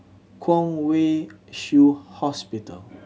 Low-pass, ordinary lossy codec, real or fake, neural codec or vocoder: none; none; real; none